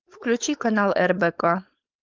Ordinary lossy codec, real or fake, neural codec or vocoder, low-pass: Opus, 24 kbps; fake; codec, 16 kHz, 4.8 kbps, FACodec; 7.2 kHz